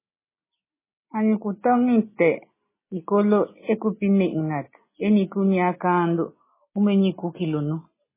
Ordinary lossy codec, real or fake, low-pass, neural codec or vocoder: MP3, 16 kbps; real; 3.6 kHz; none